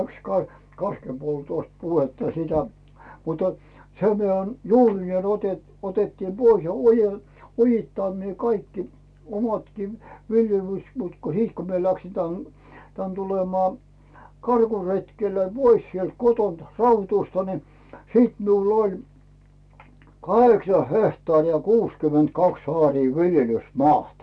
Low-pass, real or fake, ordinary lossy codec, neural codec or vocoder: none; real; none; none